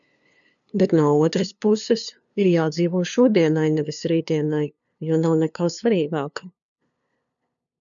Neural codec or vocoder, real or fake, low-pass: codec, 16 kHz, 2 kbps, FunCodec, trained on LibriTTS, 25 frames a second; fake; 7.2 kHz